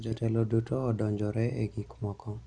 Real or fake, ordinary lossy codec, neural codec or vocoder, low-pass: real; Opus, 64 kbps; none; 9.9 kHz